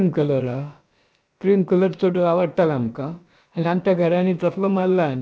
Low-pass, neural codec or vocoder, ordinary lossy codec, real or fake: none; codec, 16 kHz, about 1 kbps, DyCAST, with the encoder's durations; none; fake